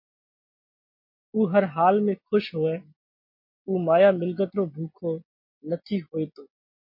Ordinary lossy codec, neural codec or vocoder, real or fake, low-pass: MP3, 32 kbps; none; real; 5.4 kHz